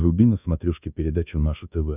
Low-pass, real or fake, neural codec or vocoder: 3.6 kHz; fake; codec, 24 kHz, 1.2 kbps, DualCodec